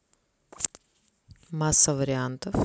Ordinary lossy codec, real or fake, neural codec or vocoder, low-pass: none; real; none; none